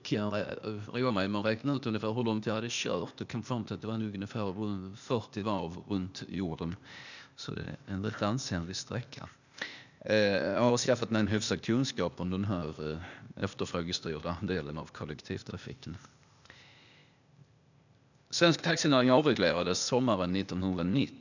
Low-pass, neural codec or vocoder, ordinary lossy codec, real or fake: 7.2 kHz; codec, 16 kHz, 0.8 kbps, ZipCodec; none; fake